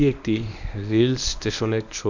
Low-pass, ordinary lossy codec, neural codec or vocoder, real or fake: 7.2 kHz; none; codec, 24 kHz, 0.9 kbps, WavTokenizer, medium speech release version 1; fake